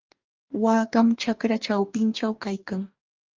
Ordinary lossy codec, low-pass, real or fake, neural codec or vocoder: Opus, 24 kbps; 7.2 kHz; fake; codec, 44.1 kHz, 2.6 kbps, DAC